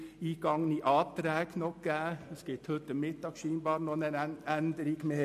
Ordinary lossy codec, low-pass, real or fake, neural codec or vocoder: MP3, 64 kbps; 14.4 kHz; real; none